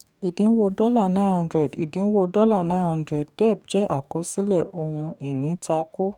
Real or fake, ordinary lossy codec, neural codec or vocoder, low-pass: fake; none; codec, 44.1 kHz, 2.6 kbps, DAC; 19.8 kHz